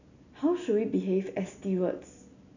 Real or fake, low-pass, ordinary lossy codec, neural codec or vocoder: real; 7.2 kHz; none; none